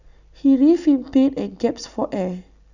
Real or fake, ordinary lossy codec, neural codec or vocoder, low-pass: real; none; none; 7.2 kHz